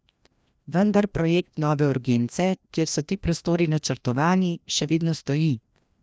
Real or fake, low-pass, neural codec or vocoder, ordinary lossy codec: fake; none; codec, 16 kHz, 1 kbps, FreqCodec, larger model; none